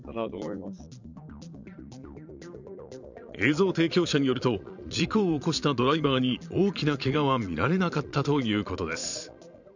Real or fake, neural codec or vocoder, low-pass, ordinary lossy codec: fake; vocoder, 22.05 kHz, 80 mel bands, Vocos; 7.2 kHz; none